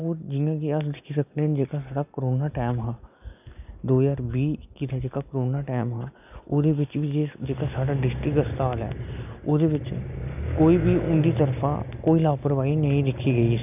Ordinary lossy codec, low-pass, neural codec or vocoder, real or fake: none; 3.6 kHz; none; real